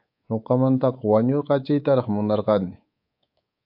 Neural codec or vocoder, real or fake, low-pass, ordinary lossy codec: codec, 24 kHz, 3.1 kbps, DualCodec; fake; 5.4 kHz; AAC, 48 kbps